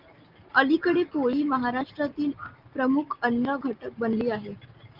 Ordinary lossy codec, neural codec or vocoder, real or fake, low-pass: Opus, 24 kbps; none; real; 5.4 kHz